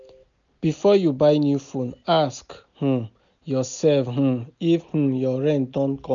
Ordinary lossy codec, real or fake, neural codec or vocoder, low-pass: none; real; none; 7.2 kHz